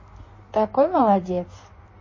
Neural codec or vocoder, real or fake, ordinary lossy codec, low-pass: codec, 16 kHz in and 24 kHz out, 1.1 kbps, FireRedTTS-2 codec; fake; MP3, 32 kbps; 7.2 kHz